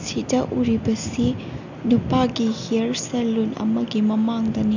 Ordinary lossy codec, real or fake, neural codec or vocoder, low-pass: none; real; none; 7.2 kHz